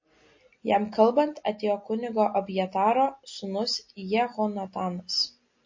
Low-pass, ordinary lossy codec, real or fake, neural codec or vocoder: 7.2 kHz; MP3, 32 kbps; real; none